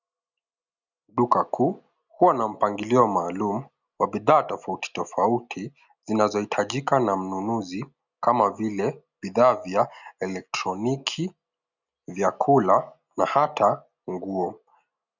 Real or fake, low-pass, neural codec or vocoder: real; 7.2 kHz; none